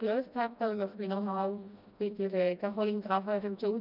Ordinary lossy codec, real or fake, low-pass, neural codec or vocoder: none; fake; 5.4 kHz; codec, 16 kHz, 1 kbps, FreqCodec, smaller model